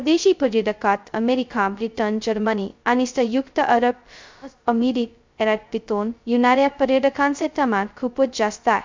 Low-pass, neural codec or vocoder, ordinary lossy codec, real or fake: 7.2 kHz; codec, 16 kHz, 0.2 kbps, FocalCodec; MP3, 64 kbps; fake